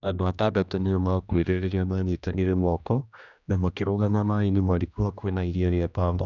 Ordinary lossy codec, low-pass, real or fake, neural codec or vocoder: none; 7.2 kHz; fake; codec, 16 kHz, 1 kbps, X-Codec, HuBERT features, trained on general audio